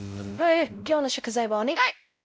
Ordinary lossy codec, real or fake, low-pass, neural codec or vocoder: none; fake; none; codec, 16 kHz, 0.5 kbps, X-Codec, WavLM features, trained on Multilingual LibriSpeech